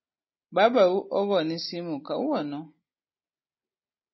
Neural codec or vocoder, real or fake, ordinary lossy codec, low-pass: none; real; MP3, 24 kbps; 7.2 kHz